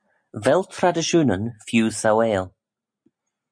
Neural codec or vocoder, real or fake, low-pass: none; real; 9.9 kHz